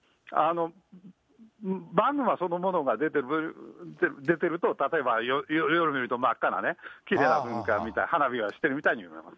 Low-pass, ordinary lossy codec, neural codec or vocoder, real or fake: none; none; none; real